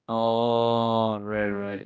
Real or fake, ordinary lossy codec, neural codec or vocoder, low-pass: fake; none; codec, 16 kHz, 1 kbps, X-Codec, HuBERT features, trained on general audio; none